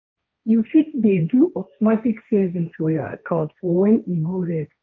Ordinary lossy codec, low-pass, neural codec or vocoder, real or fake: none; none; codec, 16 kHz, 1.1 kbps, Voila-Tokenizer; fake